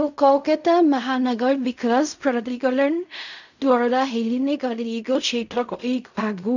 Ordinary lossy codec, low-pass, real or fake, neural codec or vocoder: none; 7.2 kHz; fake; codec, 16 kHz in and 24 kHz out, 0.4 kbps, LongCat-Audio-Codec, fine tuned four codebook decoder